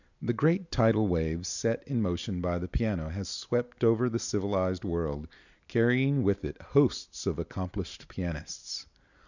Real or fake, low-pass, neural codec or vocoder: real; 7.2 kHz; none